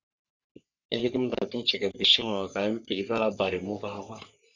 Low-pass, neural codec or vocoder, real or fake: 7.2 kHz; codec, 44.1 kHz, 3.4 kbps, Pupu-Codec; fake